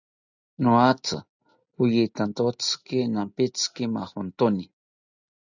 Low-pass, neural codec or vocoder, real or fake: 7.2 kHz; none; real